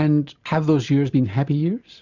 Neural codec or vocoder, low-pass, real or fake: none; 7.2 kHz; real